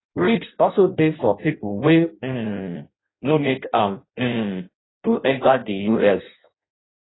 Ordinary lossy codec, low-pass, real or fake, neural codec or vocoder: AAC, 16 kbps; 7.2 kHz; fake; codec, 16 kHz in and 24 kHz out, 0.6 kbps, FireRedTTS-2 codec